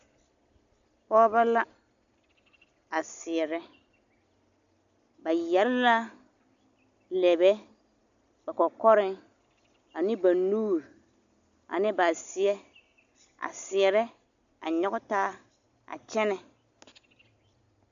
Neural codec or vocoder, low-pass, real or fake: none; 7.2 kHz; real